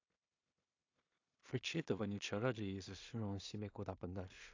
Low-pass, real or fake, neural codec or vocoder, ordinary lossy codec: 7.2 kHz; fake; codec, 16 kHz in and 24 kHz out, 0.4 kbps, LongCat-Audio-Codec, two codebook decoder; AAC, 48 kbps